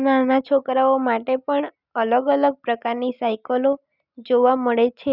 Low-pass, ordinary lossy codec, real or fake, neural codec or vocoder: 5.4 kHz; none; real; none